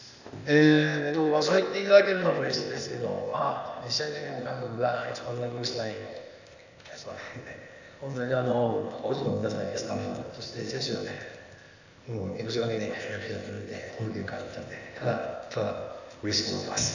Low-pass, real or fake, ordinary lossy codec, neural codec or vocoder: 7.2 kHz; fake; none; codec, 16 kHz, 0.8 kbps, ZipCodec